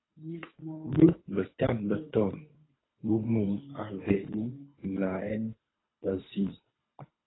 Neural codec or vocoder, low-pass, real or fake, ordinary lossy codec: codec, 24 kHz, 3 kbps, HILCodec; 7.2 kHz; fake; AAC, 16 kbps